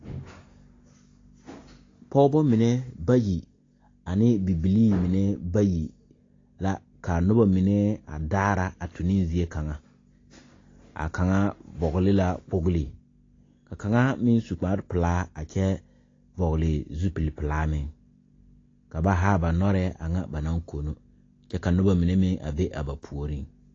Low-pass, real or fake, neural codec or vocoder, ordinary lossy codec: 7.2 kHz; real; none; AAC, 32 kbps